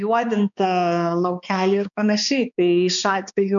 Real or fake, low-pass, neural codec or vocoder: fake; 7.2 kHz; codec, 16 kHz, 2 kbps, X-Codec, HuBERT features, trained on balanced general audio